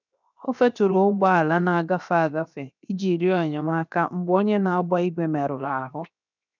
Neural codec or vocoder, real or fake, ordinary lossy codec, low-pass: codec, 16 kHz, 0.7 kbps, FocalCodec; fake; none; 7.2 kHz